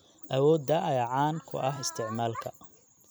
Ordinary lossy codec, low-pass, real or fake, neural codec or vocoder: none; none; fake; vocoder, 44.1 kHz, 128 mel bands every 256 samples, BigVGAN v2